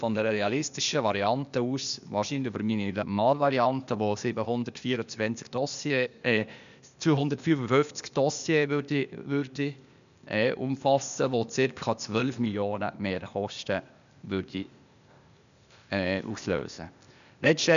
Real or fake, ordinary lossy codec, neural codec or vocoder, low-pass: fake; none; codec, 16 kHz, 0.8 kbps, ZipCodec; 7.2 kHz